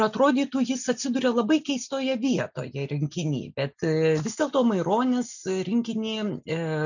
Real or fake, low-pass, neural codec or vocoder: real; 7.2 kHz; none